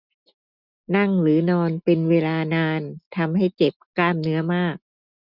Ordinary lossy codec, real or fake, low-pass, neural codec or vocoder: none; real; 5.4 kHz; none